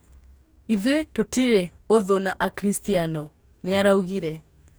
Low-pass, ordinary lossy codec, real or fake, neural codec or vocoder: none; none; fake; codec, 44.1 kHz, 2.6 kbps, DAC